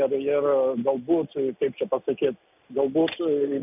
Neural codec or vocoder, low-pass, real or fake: none; 3.6 kHz; real